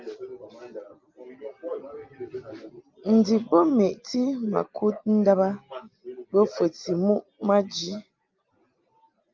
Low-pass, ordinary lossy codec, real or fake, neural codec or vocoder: 7.2 kHz; Opus, 24 kbps; real; none